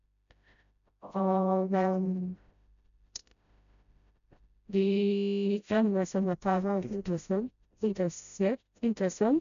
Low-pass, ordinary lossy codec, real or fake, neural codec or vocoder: 7.2 kHz; MP3, 96 kbps; fake; codec, 16 kHz, 0.5 kbps, FreqCodec, smaller model